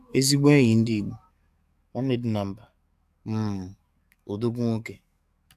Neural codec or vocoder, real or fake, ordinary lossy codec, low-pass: codec, 44.1 kHz, 7.8 kbps, DAC; fake; AAC, 96 kbps; 14.4 kHz